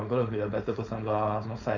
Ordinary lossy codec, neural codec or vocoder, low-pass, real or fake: none; codec, 16 kHz, 4.8 kbps, FACodec; 7.2 kHz; fake